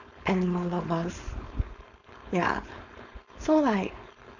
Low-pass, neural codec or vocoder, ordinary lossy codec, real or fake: 7.2 kHz; codec, 16 kHz, 4.8 kbps, FACodec; none; fake